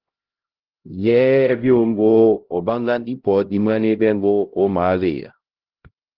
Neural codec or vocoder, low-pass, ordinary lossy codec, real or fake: codec, 16 kHz, 0.5 kbps, X-Codec, HuBERT features, trained on LibriSpeech; 5.4 kHz; Opus, 16 kbps; fake